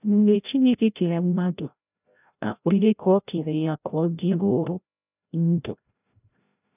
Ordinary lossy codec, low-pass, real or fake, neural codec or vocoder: none; 3.6 kHz; fake; codec, 16 kHz, 0.5 kbps, FreqCodec, larger model